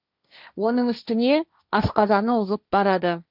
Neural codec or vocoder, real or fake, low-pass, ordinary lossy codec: codec, 16 kHz, 1.1 kbps, Voila-Tokenizer; fake; 5.4 kHz; none